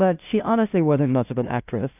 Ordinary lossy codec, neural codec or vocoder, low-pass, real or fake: AAC, 32 kbps; codec, 16 kHz, 0.5 kbps, FunCodec, trained on LibriTTS, 25 frames a second; 3.6 kHz; fake